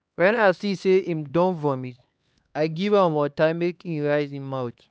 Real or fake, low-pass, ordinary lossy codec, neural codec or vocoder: fake; none; none; codec, 16 kHz, 2 kbps, X-Codec, HuBERT features, trained on LibriSpeech